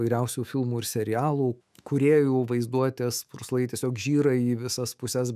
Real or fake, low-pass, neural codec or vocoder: fake; 14.4 kHz; autoencoder, 48 kHz, 128 numbers a frame, DAC-VAE, trained on Japanese speech